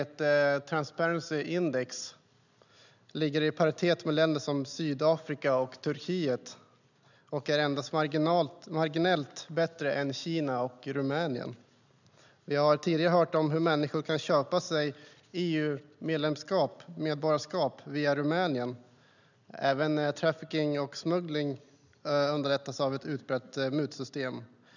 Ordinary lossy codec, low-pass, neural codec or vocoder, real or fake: none; 7.2 kHz; none; real